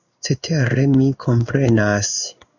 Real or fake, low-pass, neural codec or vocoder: fake; 7.2 kHz; codec, 16 kHz in and 24 kHz out, 1 kbps, XY-Tokenizer